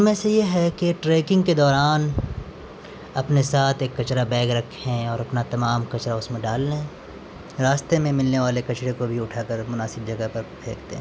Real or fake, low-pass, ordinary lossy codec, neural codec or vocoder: real; none; none; none